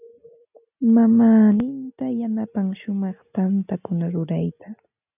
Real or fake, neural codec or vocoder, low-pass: real; none; 3.6 kHz